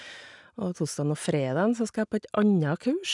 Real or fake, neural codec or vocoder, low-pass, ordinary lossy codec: real; none; 10.8 kHz; none